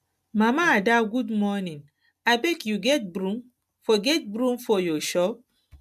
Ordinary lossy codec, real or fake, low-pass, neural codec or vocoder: MP3, 96 kbps; real; 14.4 kHz; none